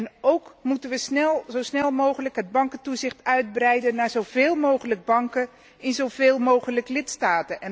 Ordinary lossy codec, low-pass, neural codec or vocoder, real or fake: none; none; none; real